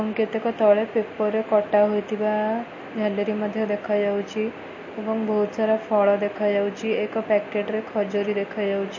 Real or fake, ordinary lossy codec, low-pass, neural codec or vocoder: real; MP3, 32 kbps; 7.2 kHz; none